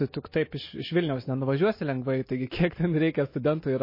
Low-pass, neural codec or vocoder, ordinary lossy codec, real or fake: 5.4 kHz; none; MP3, 24 kbps; real